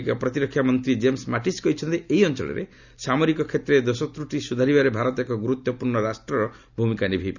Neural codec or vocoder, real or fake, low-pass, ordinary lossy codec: none; real; 7.2 kHz; none